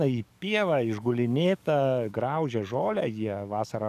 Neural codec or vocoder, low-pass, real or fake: codec, 44.1 kHz, 7.8 kbps, DAC; 14.4 kHz; fake